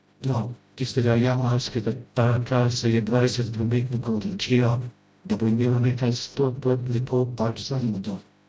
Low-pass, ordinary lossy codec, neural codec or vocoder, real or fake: none; none; codec, 16 kHz, 0.5 kbps, FreqCodec, smaller model; fake